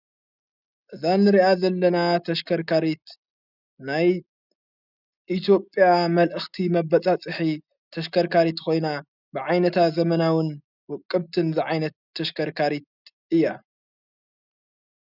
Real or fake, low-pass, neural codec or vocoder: real; 5.4 kHz; none